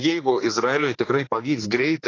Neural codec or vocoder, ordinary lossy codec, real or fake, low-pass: codec, 16 kHz, 2 kbps, X-Codec, HuBERT features, trained on general audio; AAC, 32 kbps; fake; 7.2 kHz